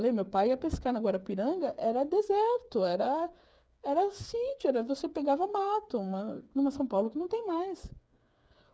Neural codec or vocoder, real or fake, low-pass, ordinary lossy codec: codec, 16 kHz, 8 kbps, FreqCodec, smaller model; fake; none; none